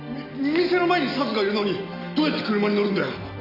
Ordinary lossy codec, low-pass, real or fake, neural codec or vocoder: none; 5.4 kHz; real; none